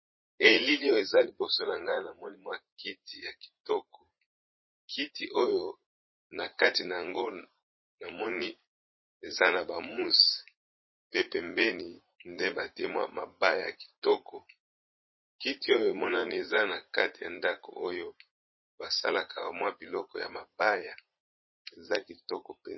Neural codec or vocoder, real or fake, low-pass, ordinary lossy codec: vocoder, 44.1 kHz, 80 mel bands, Vocos; fake; 7.2 kHz; MP3, 24 kbps